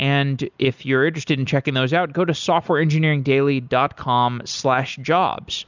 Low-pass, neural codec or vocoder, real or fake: 7.2 kHz; none; real